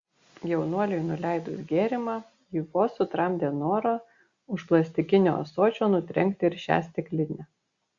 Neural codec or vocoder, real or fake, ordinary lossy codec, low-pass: none; real; Opus, 64 kbps; 7.2 kHz